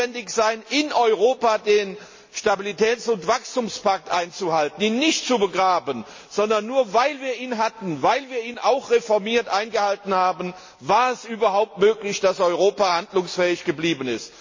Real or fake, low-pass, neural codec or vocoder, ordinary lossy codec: real; 7.2 kHz; none; MP3, 32 kbps